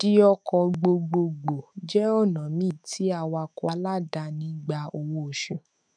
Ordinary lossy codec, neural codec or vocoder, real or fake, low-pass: none; autoencoder, 48 kHz, 128 numbers a frame, DAC-VAE, trained on Japanese speech; fake; 9.9 kHz